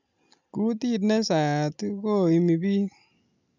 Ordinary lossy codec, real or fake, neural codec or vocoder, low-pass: none; real; none; 7.2 kHz